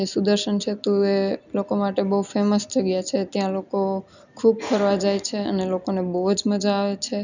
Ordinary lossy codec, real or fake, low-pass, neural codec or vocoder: none; real; 7.2 kHz; none